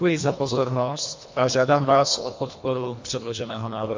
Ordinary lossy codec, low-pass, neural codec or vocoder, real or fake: MP3, 48 kbps; 7.2 kHz; codec, 24 kHz, 1.5 kbps, HILCodec; fake